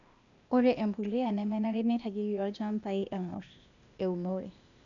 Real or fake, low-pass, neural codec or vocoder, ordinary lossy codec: fake; 7.2 kHz; codec, 16 kHz, 0.8 kbps, ZipCodec; none